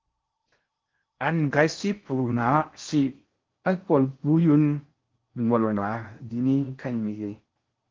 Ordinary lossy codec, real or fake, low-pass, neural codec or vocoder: Opus, 32 kbps; fake; 7.2 kHz; codec, 16 kHz in and 24 kHz out, 0.6 kbps, FocalCodec, streaming, 4096 codes